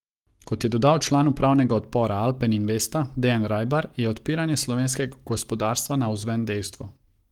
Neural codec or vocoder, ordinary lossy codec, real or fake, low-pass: codec, 44.1 kHz, 7.8 kbps, Pupu-Codec; Opus, 24 kbps; fake; 19.8 kHz